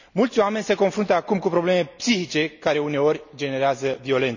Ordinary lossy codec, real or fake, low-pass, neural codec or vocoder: none; real; 7.2 kHz; none